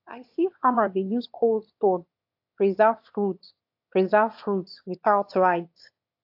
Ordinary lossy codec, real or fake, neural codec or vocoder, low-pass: AAC, 48 kbps; fake; autoencoder, 22.05 kHz, a latent of 192 numbers a frame, VITS, trained on one speaker; 5.4 kHz